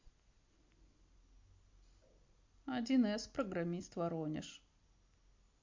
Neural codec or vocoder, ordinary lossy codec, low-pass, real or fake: none; MP3, 48 kbps; 7.2 kHz; real